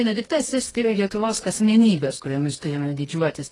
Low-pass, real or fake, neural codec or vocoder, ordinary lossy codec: 10.8 kHz; fake; codec, 24 kHz, 0.9 kbps, WavTokenizer, medium music audio release; AAC, 32 kbps